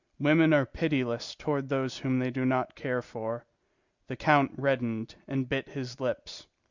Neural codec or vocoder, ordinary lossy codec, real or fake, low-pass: none; Opus, 64 kbps; real; 7.2 kHz